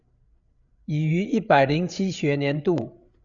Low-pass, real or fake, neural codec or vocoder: 7.2 kHz; fake; codec, 16 kHz, 8 kbps, FreqCodec, larger model